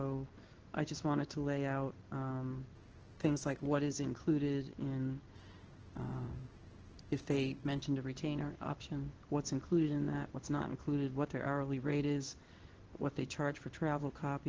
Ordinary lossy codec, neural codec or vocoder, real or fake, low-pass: Opus, 16 kbps; codec, 16 kHz in and 24 kHz out, 1 kbps, XY-Tokenizer; fake; 7.2 kHz